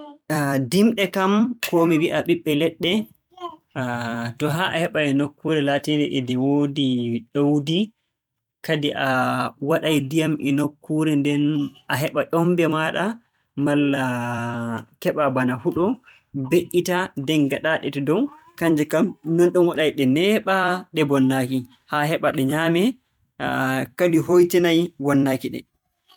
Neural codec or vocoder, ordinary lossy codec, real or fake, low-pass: vocoder, 44.1 kHz, 128 mel bands every 256 samples, BigVGAN v2; MP3, 96 kbps; fake; 19.8 kHz